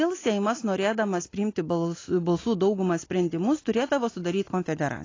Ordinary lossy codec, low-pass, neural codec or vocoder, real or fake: AAC, 32 kbps; 7.2 kHz; none; real